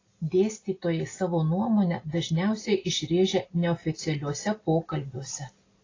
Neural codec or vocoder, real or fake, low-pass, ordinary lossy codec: none; real; 7.2 kHz; AAC, 32 kbps